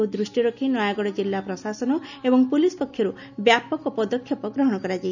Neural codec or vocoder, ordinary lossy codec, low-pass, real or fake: none; none; 7.2 kHz; real